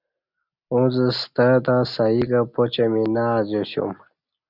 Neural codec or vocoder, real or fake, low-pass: none; real; 5.4 kHz